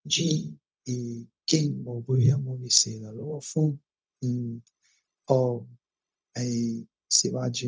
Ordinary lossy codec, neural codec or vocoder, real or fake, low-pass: none; codec, 16 kHz, 0.4 kbps, LongCat-Audio-Codec; fake; none